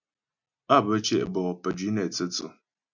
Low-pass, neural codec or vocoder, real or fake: 7.2 kHz; none; real